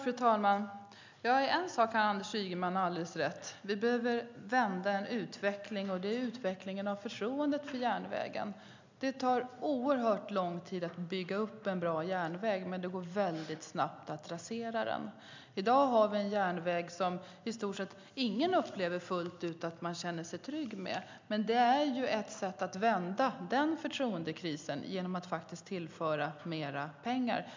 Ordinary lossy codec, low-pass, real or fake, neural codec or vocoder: MP3, 64 kbps; 7.2 kHz; real; none